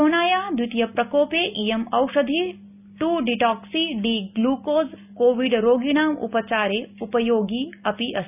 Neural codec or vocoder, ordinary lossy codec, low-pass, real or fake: none; none; 3.6 kHz; real